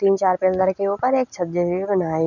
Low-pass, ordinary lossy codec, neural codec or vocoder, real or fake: 7.2 kHz; none; none; real